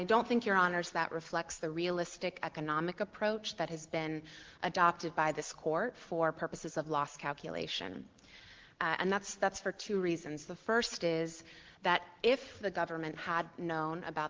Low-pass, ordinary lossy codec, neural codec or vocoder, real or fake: 7.2 kHz; Opus, 16 kbps; none; real